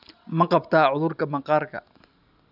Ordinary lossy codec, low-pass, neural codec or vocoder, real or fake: none; 5.4 kHz; none; real